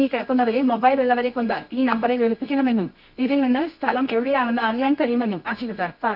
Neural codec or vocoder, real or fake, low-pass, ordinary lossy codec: codec, 24 kHz, 0.9 kbps, WavTokenizer, medium music audio release; fake; 5.4 kHz; none